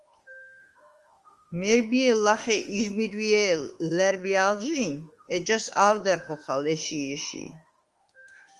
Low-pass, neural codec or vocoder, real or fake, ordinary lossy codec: 10.8 kHz; autoencoder, 48 kHz, 32 numbers a frame, DAC-VAE, trained on Japanese speech; fake; Opus, 24 kbps